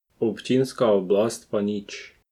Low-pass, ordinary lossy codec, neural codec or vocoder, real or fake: 19.8 kHz; none; none; real